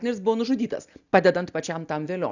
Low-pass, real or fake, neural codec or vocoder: 7.2 kHz; real; none